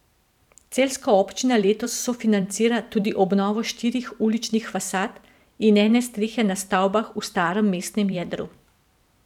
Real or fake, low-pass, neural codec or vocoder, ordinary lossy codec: fake; 19.8 kHz; vocoder, 44.1 kHz, 128 mel bands every 512 samples, BigVGAN v2; none